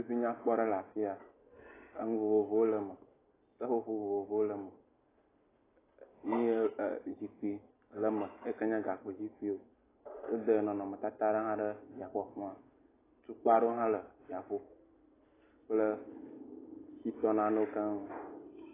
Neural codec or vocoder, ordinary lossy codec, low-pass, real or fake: none; AAC, 16 kbps; 3.6 kHz; real